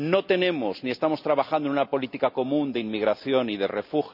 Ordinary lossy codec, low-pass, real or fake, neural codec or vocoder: none; 5.4 kHz; real; none